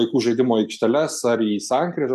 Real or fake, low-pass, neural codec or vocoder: real; 14.4 kHz; none